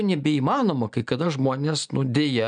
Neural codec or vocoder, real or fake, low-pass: none; real; 9.9 kHz